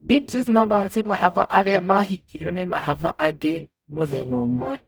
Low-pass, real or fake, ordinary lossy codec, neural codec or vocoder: none; fake; none; codec, 44.1 kHz, 0.9 kbps, DAC